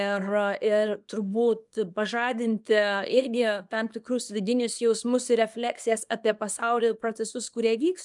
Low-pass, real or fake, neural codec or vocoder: 10.8 kHz; fake; codec, 24 kHz, 0.9 kbps, WavTokenizer, small release